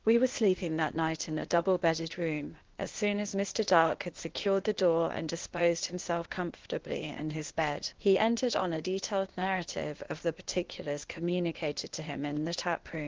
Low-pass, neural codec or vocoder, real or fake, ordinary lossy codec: 7.2 kHz; codec, 16 kHz, 0.8 kbps, ZipCodec; fake; Opus, 16 kbps